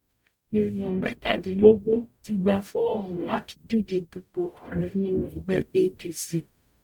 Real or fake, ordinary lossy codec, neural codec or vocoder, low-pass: fake; none; codec, 44.1 kHz, 0.9 kbps, DAC; 19.8 kHz